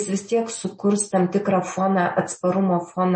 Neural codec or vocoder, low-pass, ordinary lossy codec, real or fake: none; 9.9 kHz; MP3, 32 kbps; real